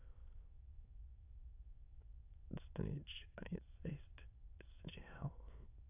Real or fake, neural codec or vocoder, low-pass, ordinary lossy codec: fake; autoencoder, 22.05 kHz, a latent of 192 numbers a frame, VITS, trained on many speakers; 3.6 kHz; AAC, 32 kbps